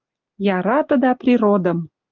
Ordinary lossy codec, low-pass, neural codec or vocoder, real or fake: Opus, 32 kbps; 7.2 kHz; none; real